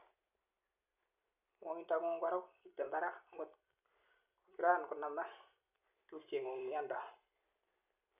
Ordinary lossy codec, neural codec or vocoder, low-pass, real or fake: none; vocoder, 44.1 kHz, 128 mel bands every 256 samples, BigVGAN v2; 3.6 kHz; fake